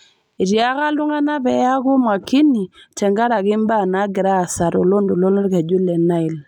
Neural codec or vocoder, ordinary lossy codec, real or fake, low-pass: none; none; real; 19.8 kHz